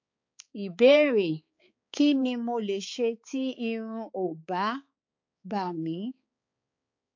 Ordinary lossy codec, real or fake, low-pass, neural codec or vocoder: MP3, 48 kbps; fake; 7.2 kHz; codec, 16 kHz, 4 kbps, X-Codec, HuBERT features, trained on balanced general audio